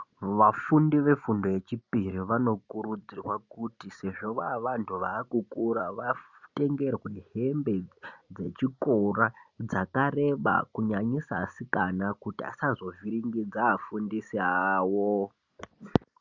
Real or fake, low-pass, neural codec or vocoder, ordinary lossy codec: real; 7.2 kHz; none; Opus, 64 kbps